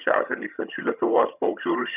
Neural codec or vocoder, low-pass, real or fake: vocoder, 22.05 kHz, 80 mel bands, HiFi-GAN; 3.6 kHz; fake